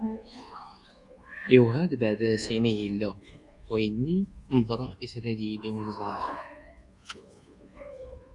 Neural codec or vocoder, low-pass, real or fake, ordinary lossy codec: codec, 24 kHz, 1.2 kbps, DualCodec; 10.8 kHz; fake; Opus, 64 kbps